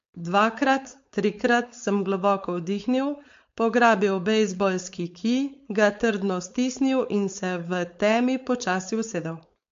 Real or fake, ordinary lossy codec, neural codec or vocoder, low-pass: fake; MP3, 48 kbps; codec, 16 kHz, 4.8 kbps, FACodec; 7.2 kHz